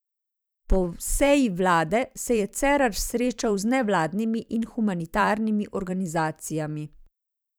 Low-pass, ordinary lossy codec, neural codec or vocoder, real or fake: none; none; vocoder, 44.1 kHz, 128 mel bands every 512 samples, BigVGAN v2; fake